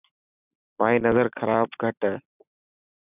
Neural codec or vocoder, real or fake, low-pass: none; real; 3.6 kHz